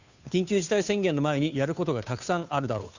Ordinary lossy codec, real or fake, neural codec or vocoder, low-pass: none; fake; codec, 16 kHz, 2 kbps, FunCodec, trained on Chinese and English, 25 frames a second; 7.2 kHz